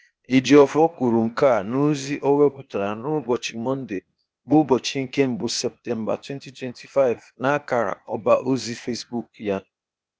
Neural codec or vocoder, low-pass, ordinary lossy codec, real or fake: codec, 16 kHz, 0.8 kbps, ZipCodec; none; none; fake